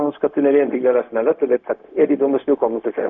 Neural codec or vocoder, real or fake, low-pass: codec, 16 kHz, 0.4 kbps, LongCat-Audio-Codec; fake; 7.2 kHz